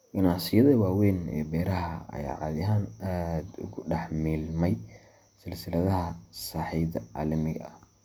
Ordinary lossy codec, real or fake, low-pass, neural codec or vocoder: none; real; none; none